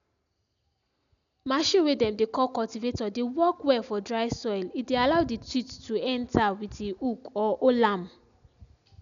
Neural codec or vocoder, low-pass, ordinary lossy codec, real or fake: none; 7.2 kHz; none; real